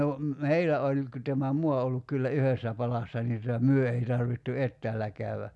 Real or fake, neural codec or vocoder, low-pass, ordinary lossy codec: real; none; none; none